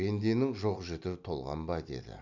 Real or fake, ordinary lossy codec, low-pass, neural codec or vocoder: real; none; 7.2 kHz; none